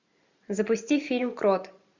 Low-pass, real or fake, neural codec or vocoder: 7.2 kHz; fake; vocoder, 44.1 kHz, 128 mel bands every 512 samples, BigVGAN v2